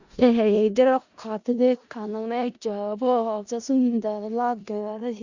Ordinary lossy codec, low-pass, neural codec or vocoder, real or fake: Opus, 64 kbps; 7.2 kHz; codec, 16 kHz in and 24 kHz out, 0.4 kbps, LongCat-Audio-Codec, four codebook decoder; fake